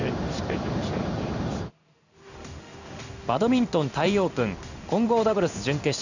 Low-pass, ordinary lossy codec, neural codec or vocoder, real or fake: 7.2 kHz; none; codec, 16 kHz in and 24 kHz out, 1 kbps, XY-Tokenizer; fake